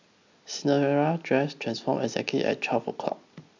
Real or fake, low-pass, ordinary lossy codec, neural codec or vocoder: real; 7.2 kHz; MP3, 64 kbps; none